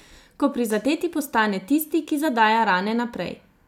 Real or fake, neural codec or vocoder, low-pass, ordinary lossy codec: real; none; 19.8 kHz; none